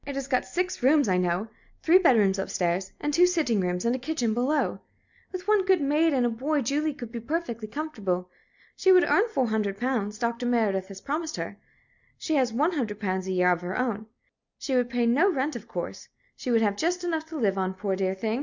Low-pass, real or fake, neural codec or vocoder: 7.2 kHz; real; none